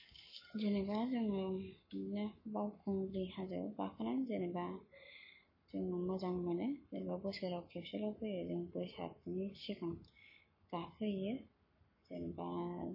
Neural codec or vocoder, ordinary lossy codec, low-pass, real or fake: codec, 44.1 kHz, 7.8 kbps, Pupu-Codec; MP3, 24 kbps; 5.4 kHz; fake